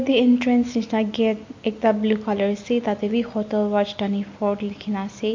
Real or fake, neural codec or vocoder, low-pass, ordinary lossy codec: real; none; 7.2 kHz; MP3, 48 kbps